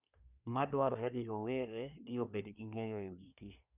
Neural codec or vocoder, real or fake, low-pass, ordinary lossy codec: codec, 24 kHz, 1 kbps, SNAC; fake; 3.6 kHz; none